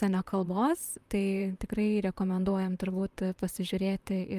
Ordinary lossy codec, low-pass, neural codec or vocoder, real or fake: Opus, 32 kbps; 14.4 kHz; vocoder, 44.1 kHz, 128 mel bands, Pupu-Vocoder; fake